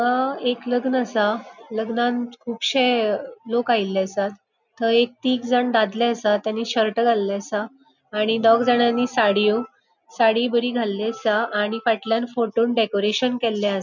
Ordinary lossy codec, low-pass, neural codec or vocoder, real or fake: none; 7.2 kHz; none; real